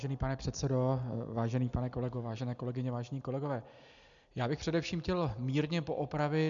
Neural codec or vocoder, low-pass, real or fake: none; 7.2 kHz; real